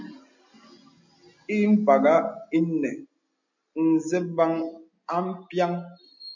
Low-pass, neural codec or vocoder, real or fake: 7.2 kHz; none; real